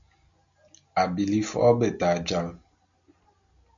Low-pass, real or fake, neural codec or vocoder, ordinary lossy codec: 7.2 kHz; real; none; MP3, 96 kbps